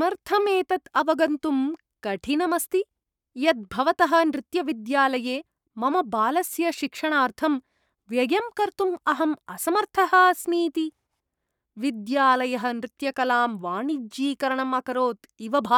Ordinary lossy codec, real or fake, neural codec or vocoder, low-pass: none; fake; codec, 44.1 kHz, 7.8 kbps, Pupu-Codec; 19.8 kHz